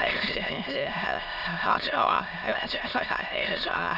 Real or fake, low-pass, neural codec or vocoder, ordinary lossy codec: fake; 5.4 kHz; autoencoder, 22.05 kHz, a latent of 192 numbers a frame, VITS, trained on many speakers; none